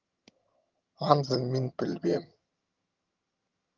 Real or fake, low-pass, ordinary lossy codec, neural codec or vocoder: fake; 7.2 kHz; Opus, 24 kbps; vocoder, 22.05 kHz, 80 mel bands, HiFi-GAN